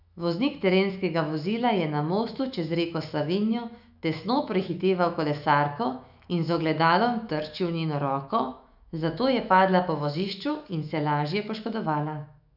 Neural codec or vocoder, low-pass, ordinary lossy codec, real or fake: autoencoder, 48 kHz, 128 numbers a frame, DAC-VAE, trained on Japanese speech; 5.4 kHz; none; fake